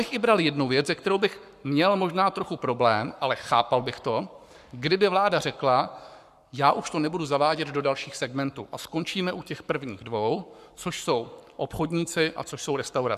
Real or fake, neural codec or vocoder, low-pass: fake; codec, 44.1 kHz, 7.8 kbps, Pupu-Codec; 14.4 kHz